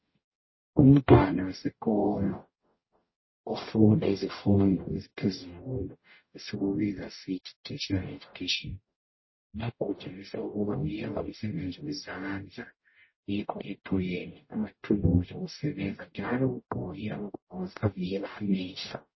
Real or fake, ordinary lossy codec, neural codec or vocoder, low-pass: fake; MP3, 24 kbps; codec, 44.1 kHz, 0.9 kbps, DAC; 7.2 kHz